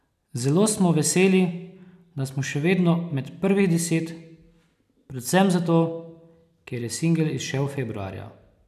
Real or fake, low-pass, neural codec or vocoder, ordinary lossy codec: real; 14.4 kHz; none; none